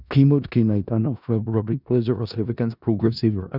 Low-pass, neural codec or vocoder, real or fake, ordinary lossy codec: 5.4 kHz; codec, 16 kHz in and 24 kHz out, 0.4 kbps, LongCat-Audio-Codec, four codebook decoder; fake; none